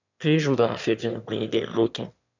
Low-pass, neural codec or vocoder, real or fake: 7.2 kHz; autoencoder, 22.05 kHz, a latent of 192 numbers a frame, VITS, trained on one speaker; fake